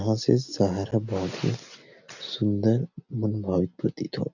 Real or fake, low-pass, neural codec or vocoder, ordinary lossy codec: real; 7.2 kHz; none; none